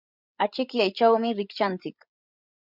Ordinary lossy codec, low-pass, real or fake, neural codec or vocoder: Opus, 64 kbps; 5.4 kHz; fake; codec, 16 kHz, 16 kbps, FreqCodec, larger model